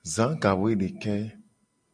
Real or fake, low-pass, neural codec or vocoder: real; 9.9 kHz; none